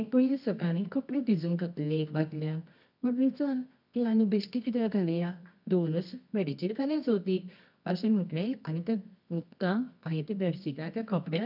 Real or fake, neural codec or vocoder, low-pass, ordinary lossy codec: fake; codec, 24 kHz, 0.9 kbps, WavTokenizer, medium music audio release; 5.4 kHz; none